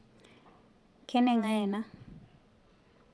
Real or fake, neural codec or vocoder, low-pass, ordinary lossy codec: fake; vocoder, 22.05 kHz, 80 mel bands, Vocos; none; none